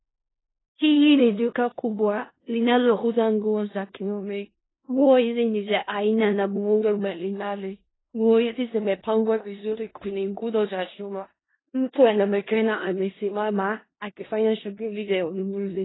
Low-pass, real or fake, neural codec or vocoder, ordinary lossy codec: 7.2 kHz; fake; codec, 16 kHz in and 24 kHz out, 0.4 kbps, LongCat-Audio-Codec, four codebook decoder; AAC, 16 kbps